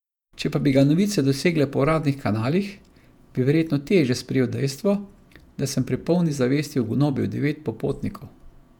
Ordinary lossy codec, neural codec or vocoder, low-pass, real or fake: none; vocoder, 48 kHz, 128 mel bands, Vocos; 19.8 kHz; fake